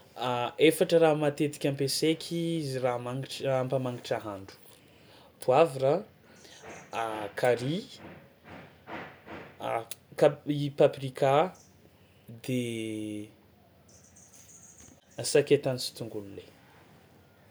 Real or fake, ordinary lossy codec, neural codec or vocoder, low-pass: real; none; none; none